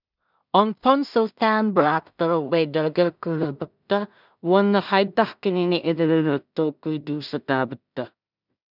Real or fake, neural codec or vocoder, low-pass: fake; codec, 16 kHz in and 24 kHz out, 0.4 kbps, LongCat-Audio-Codec, two codebook decoder; 5.4 kHz